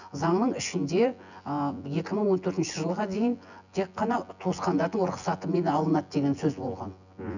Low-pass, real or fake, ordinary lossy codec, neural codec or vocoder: 7.2 kHz; fake; none; vocoder, 24 kHz, 100 mel bands, Vocos